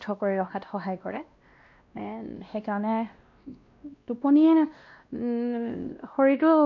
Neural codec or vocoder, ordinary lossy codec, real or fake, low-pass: codec, 16 kHz, 1 kbps, X-Codec, WavLM features, trained on Multilingual LibriSpeech; MP3, 48 kbps; fake; 7.2 kHz